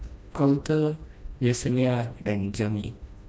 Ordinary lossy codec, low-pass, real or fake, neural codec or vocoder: none; none; fake; codec, 16 kHz, 1 kbps, FreqCodec, smaller model